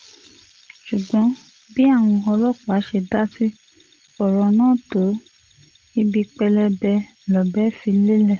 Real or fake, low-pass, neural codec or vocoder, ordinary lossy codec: real; 7.2 kHz; none; Opus, 16 kbps